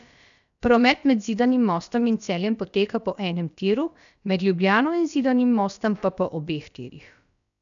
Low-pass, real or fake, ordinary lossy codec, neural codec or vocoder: 7.2 kHz; fake; none; codec, 16 kHz, about 1 kbps, DyCAST, with the encoder's durations